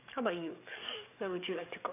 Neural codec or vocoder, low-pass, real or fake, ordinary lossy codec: codec, 44.1 kHz, 7.8 kbps, DAC; 3.6 kHz; fake; none